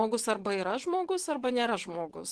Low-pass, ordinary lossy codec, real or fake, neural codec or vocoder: 10.8 kHz; Opus, 16 kbps; fake; vocoder, 24 kHz, 100 mel bands, Vocos